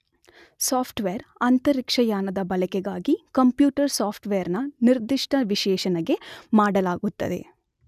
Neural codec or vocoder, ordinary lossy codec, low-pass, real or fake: none; none; 14.4 kHz; real